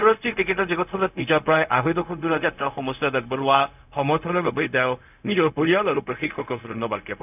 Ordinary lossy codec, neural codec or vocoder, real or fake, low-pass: none; codec, 16 kHz, 0.4 kbps, LongCat-Audio-Codec; fake; 3.6 kHz